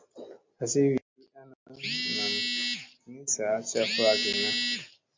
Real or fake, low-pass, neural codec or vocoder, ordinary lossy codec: real; 7.2 kHz; none; MP3, 64 kbps